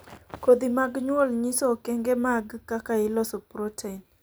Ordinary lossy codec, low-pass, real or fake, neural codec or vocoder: none; none; real; none